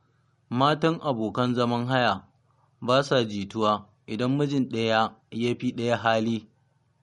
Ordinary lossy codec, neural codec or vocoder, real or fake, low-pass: MP3, 48 kbps; none; real; 19.8 kHz